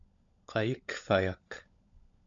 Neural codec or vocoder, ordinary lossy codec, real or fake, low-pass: codec, 16 kHz, 16 kbps, FunCodec, trained on LibriTTS, 50 frames a second; AAC, 64 kbps; fake; 7.2 kHz